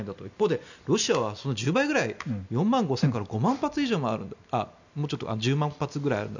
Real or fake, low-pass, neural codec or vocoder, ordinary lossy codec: real; 7.2 kHz; none; none